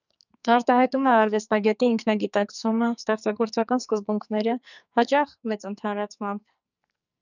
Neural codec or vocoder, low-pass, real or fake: codec, 44.1 kHz, 2.6 kbps, SNAC; 7.2 kHz; fake